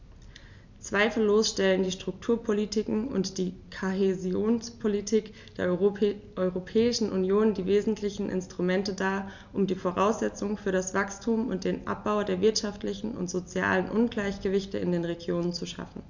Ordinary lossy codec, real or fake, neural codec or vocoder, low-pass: none; real; none; 7.2 kHz